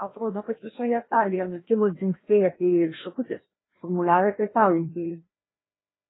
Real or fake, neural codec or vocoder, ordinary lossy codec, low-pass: fake; codec, 16 kHz, 1 kbps, FreqCodec, larger model; AAC, 16 kbps; 7.2 kHz